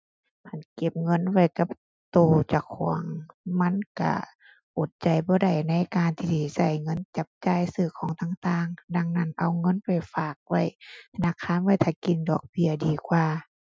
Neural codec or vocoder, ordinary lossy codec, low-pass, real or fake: none; none; none; real